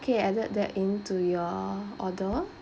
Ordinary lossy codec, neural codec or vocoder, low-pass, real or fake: none; none; none; real